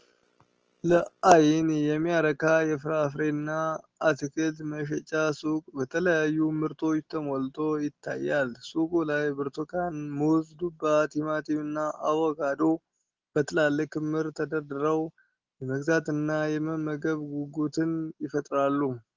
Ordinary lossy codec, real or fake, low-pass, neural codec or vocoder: Opus, 16 kbps; real; 7.2 kHz; none